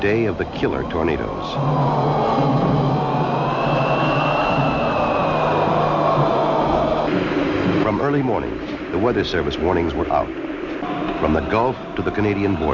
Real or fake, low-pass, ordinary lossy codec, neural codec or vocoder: real; 7.2 kHz; Opus, 64 kbps; none